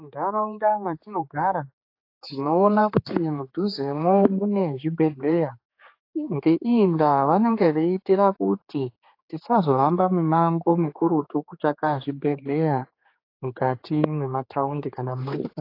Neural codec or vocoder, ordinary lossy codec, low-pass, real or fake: codec, 16 kHz, 4 kbps, X-Codec, HuBERT features, trained on general audio; AAC, 32 kbps; 5.4 kHz; fake